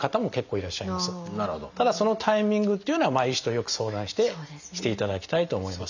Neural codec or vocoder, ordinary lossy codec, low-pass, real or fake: none; none; 7.2 kHz; real